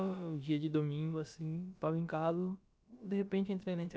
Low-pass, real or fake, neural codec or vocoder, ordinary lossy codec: none; fake; codec, 16 kHz, about 1 kbps, DyCAST, with the encoder's durations; none